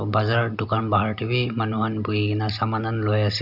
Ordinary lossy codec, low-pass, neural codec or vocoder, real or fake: none; 5.4 kHz; none; real